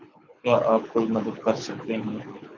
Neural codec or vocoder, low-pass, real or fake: codec, 24 kHz, 6 kbps, HILCodec; 7.2 kHz; fake